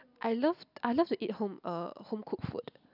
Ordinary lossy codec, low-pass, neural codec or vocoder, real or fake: none; 5.4 kHz; none; real